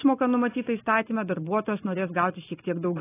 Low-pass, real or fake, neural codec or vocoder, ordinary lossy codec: 3.6 kHz; real; none; AAC, 16 kbps